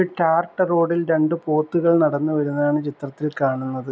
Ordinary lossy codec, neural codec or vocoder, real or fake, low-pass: none; none; real; none